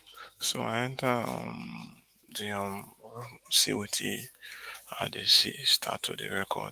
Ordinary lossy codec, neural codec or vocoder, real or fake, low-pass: Opus, 32 kbps; autoencoder, 48 kHz, 128 numbers a frame, DAC-VAE, trained on Japanese speech; fake; 14.4 kHz